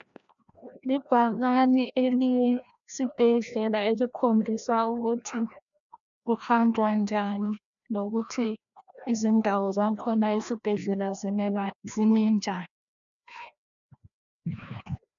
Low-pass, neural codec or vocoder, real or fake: 7.2 kHz; codec, 16 kHz, 1 kbps, FreqCodec, larger model; fake